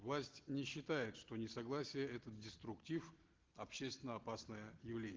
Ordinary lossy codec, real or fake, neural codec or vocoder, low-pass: Opus, 16 kbps; real; none; 7.2 kHz